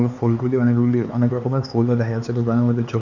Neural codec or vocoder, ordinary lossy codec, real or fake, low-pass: codec, 16 kHz, 4 kbps, X-Codec, HuBERT features, trained on LibriSpeech; none; fake; 7.2 kHz